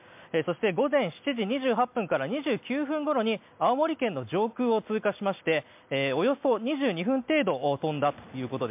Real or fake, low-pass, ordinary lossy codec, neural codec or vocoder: real; 3.6 kHz; MP3, 32 kbps; none